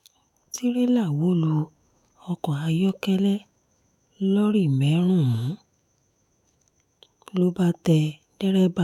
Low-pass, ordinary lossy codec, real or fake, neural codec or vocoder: 19.8 kHz; none; fake; autoencoder, 48 kHz, 128 numbers a frame, DAC-VAE, trained on Japanese speech